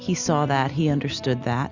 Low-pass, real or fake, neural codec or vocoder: 7.2 kHz; real; none